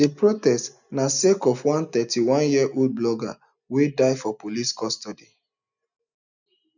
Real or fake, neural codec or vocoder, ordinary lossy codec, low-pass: real; none; none; 7.2 kHz